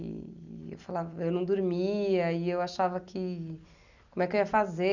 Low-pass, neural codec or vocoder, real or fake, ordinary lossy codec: 7.2 kHz; none; real; none